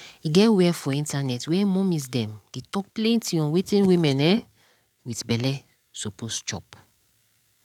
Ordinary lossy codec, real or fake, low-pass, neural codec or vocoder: none; fake; 19.8 kHz; codec, 44.1 kHz, 7.8 kbps, DAC